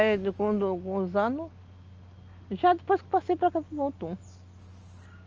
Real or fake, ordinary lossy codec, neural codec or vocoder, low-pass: real; Opus, 24 kbps; none; 7.2 kHz